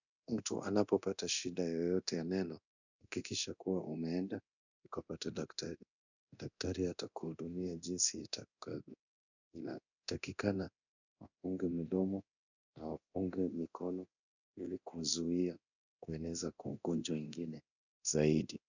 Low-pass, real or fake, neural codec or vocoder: 7.2 kHz; fake; codec, 24 kHz, 0.9 kbps, DualCodec